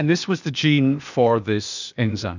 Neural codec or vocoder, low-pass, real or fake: codec, 16 kHz, 0.8 kbps, ZipCodec; 7.2 kHz; fake